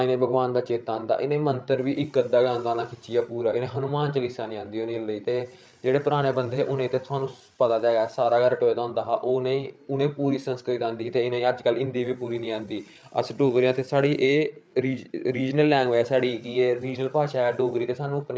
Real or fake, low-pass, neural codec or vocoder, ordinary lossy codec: fake; none; codec, 16 kHz, 8 kbps, FreqCodec, larger model; none